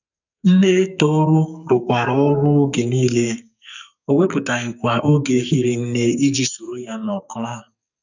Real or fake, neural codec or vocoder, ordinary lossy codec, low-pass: fake; codec, 44.1 kHz, 2.6 kbps, SNAC; none; 7.2 kHz